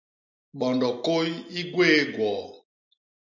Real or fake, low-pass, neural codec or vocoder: real; 7.2 kHz; none